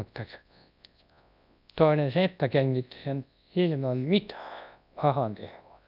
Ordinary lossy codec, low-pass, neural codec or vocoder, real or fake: none; 5.4 kHz; codec, 24 kHz, 0.9 kbps, WavTokenizer, large speech release; fake